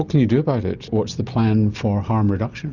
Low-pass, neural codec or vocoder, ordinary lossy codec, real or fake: 7.2 kHz; none; Opus, 64 kbps; real